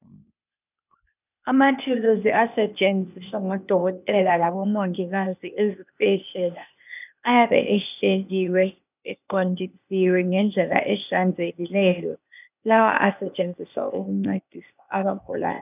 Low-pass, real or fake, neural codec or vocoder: 3.6 kHz; fake; codec, 16 kHz, 0.8 kbps, ZipCodec